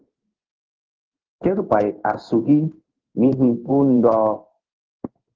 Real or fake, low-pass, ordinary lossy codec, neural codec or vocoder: fake; 7.2 kHz; Opus, 16 kbps; codec, 16 kHz in and 24 kHz out, 1 kbps, XY-Tokenizer